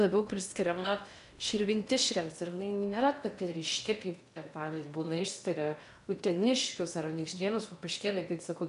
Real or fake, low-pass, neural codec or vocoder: fake; 10.8 kHz; codec, 16 kHz in and 24 kHz out, 0.8 kbps, FocalCodec, streaming, 65536 codes